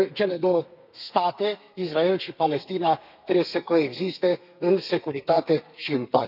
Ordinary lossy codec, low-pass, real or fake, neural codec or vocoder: none; 5.4 kHz; fake; codec, 32 kHz, 1.9 kbps, SNAC